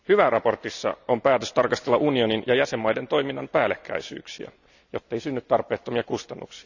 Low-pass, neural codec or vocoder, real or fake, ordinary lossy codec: 7.2 kHz; none; real; none